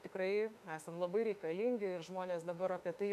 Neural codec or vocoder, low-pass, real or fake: autoencoder, 48 kHz, 32 numbers a frame, DAC-VAE, trained on Japanese speech; 14.4 kHz; fake